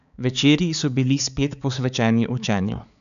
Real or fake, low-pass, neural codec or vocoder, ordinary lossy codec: fake; 7.2 kHz; codec, 16 kHz, 4 kbps, X-Codec, HuBERT features, trained on balanced general audio; none